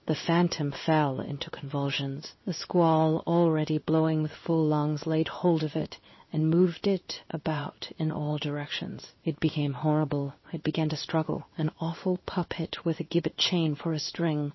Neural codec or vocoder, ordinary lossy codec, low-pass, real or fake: codec, 16 kHz in and 24 kHz out, 1 kbps, XY-Tokenizer; MP3, 24 kbps; 7.2 kHz; fake